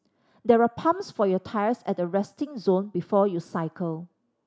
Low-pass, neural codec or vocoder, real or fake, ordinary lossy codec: none; none; real; none